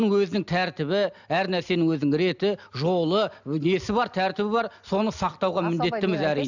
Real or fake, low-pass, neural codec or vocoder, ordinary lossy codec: real; 7.2 kHz; none; none